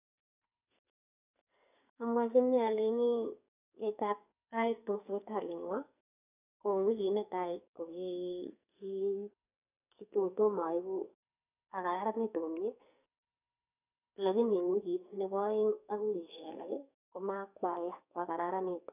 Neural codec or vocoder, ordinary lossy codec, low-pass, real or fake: codec, 44.1 kHz, 2.6 kbps, SNAC; none; 3.6 kHz; fake